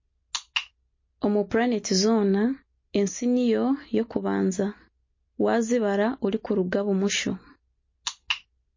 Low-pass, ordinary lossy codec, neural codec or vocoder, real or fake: 7.2 kHz; MP3, 32 kbps; none; real